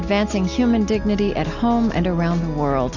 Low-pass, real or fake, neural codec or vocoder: 7.2 kHz; real; none